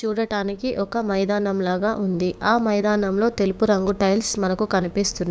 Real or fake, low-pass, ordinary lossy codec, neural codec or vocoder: fake; none; none; codec, 16 kHz, 6 kbps, DAC